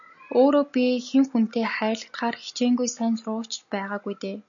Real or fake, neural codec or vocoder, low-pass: real; none; 7.2 kHz